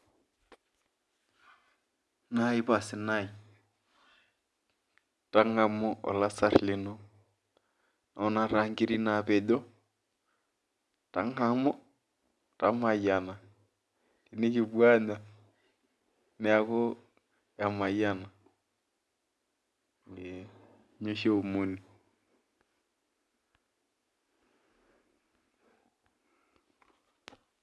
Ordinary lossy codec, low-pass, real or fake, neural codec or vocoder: none; none; real; none